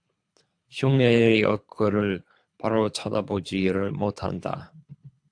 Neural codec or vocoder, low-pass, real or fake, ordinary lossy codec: codec, 24 kHz, 3 kbps, HILCodec; 9.9 kHz; fake; AAC, 64 kbps